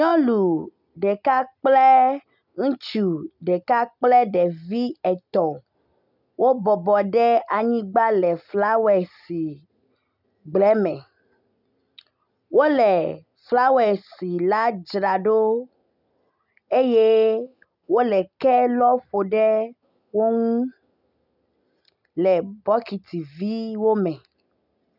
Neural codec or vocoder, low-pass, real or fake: none; 5.4 kHz; real